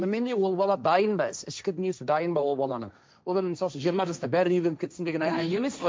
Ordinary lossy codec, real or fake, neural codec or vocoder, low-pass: none; fake; codec, 16 kHz, 1.1 kbps, Voila-Tokenizer; none